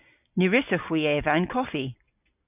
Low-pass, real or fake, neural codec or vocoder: 3.6 kHz; real; none